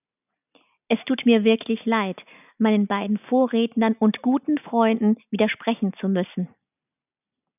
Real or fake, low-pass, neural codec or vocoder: real; 3.6 kHz; none